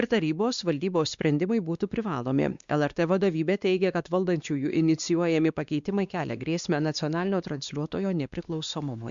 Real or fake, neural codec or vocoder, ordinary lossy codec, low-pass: fake; codec, 16 kHz, 2 kbps, X-Codec, WavLM features, trained on Multilingual LibriSpeech; Opus, 64 kbps; 7.2 kHz